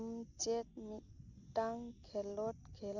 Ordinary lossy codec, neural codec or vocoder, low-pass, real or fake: none; none; 7.2 kHz; real